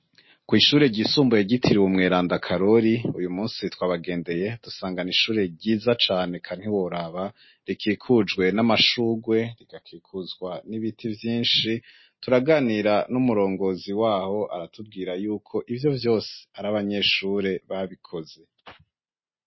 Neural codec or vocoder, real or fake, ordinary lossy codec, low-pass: none; real; MP3, 24 kbps; 7.2 kHz